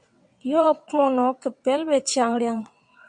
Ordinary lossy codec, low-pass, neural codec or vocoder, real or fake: MP3, 64 kbps; 9.9 kHz; vocoder, 22.05 kHz, 80 mel bands, WaveNeXt; fake